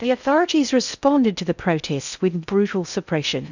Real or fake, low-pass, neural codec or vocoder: fake; 7.2 kHz; codec, 16 kHz in and 24 kHz out, 0.6 kbps, FocalCodec, streaming, 2048 codes